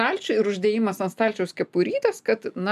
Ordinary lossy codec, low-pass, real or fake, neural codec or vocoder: MP3, 96 kbps; 14.4 kHz; real; none